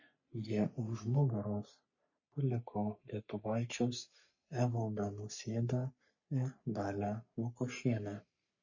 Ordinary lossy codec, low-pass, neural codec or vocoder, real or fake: MP3, 32 kbps; 7.2 kHz; codec, 44.1 kHz, 3.4 kbps, Pupu-Codec; fake